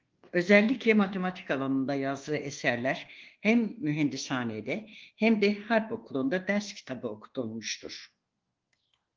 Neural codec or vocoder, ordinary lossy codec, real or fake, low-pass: codec, 24 kHz, 1.2 kbps, DualCodec; Opus, 16 kbps; fake; 7.2 kHz